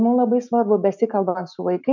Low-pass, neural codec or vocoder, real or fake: 7.2 kHz; none; real